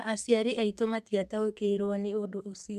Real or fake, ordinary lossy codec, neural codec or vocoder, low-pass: fake; MP3, 96 kbps; codec, 32 kHz, 1.9 kbps, SNAC; 14.4 kHz